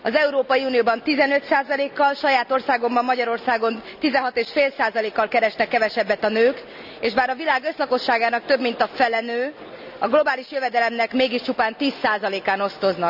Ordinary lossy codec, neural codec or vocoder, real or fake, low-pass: none; none; real; 5.4 kHz